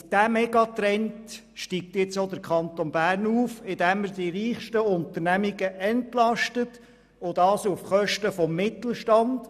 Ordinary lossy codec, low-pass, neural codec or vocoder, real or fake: none; 14.4 kHz; none; real